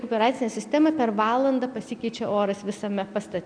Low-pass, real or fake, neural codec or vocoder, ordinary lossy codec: 9.9 kHz; real; none; MP3, 96 kbps